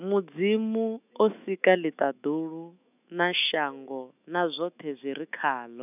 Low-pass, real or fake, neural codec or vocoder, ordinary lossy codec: 3.6 kHz; fake; autoencoder, 48 kHz, 128 numbers a frame, DAC-VAE, trained on Japanese speech; none